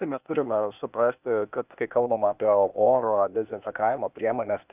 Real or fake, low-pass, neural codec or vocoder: fake; 3.6 kHz; codec, 16 kHz, 0.8 kbps, ZipCodec